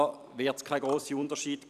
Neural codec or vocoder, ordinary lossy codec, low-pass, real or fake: vocoder, 44.1 kHz, 128 mel bands every 256 samples, BigVGAN v2; none; 14.4 kHz; fake